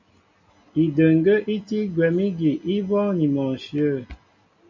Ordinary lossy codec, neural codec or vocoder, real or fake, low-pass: MP3, 64 kbps; none; real; 7.2 kHz